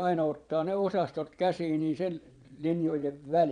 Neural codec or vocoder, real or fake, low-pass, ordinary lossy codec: vocoder, 22.05 kHz, 80 mel bands, Vocos; fake; 9.9 kHz; none